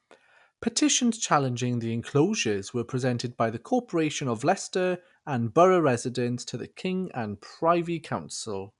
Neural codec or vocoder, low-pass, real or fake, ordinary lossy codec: none; 10.8 kHz; real; none